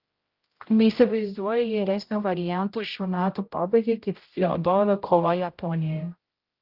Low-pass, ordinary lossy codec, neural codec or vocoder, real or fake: 5.4 kHz; Opus, 32 kbps; codec, 16 kHz, 0.5 kbps, X-Codec, HuBERT features, trained on general audio; fake